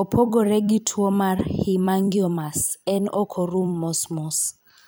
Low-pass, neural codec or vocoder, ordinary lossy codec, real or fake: none; vocoder, 44.1 kHz, 128 mel bands every 256 samples, BigVGAN v2; none; fake